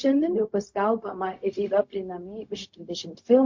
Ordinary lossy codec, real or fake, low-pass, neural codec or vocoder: MP3, 48 kbps; fake; 7.2 kHz; codec, 16 kHz, 0.4 kbps, LongCat-Audio-Codec